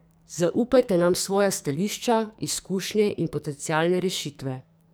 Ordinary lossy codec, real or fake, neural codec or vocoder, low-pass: none; fake; codec, 44.1 kHz, 2.6 kbps, SNAC; none